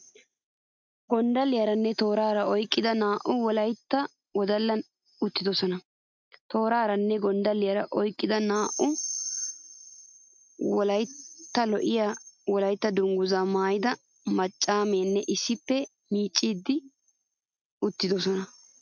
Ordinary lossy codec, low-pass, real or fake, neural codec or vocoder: MP3, 64 kbps; 7.2 kHz; real; none